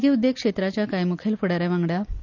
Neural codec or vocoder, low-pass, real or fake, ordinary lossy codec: none; 7.2 kHz; real; none